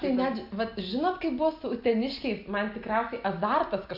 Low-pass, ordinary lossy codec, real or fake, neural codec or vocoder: 5.4 kHz; MP3, 48 kbps; real; none